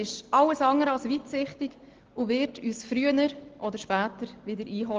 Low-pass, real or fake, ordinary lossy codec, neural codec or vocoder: 7.2 kHz; real; Opus, 16 kbps; none